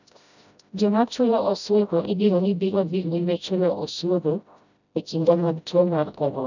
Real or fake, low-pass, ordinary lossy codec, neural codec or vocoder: fake; 7.2 kHz; none; codec, 16 kHz, 0.5 kbps, FreqCodec, smaller model